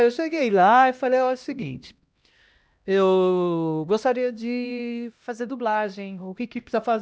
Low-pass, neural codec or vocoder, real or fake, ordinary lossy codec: none; codec, 16 kHz, 1 kbps, X-Codec, HuBERT features, trained on LibriSpeech; fake; none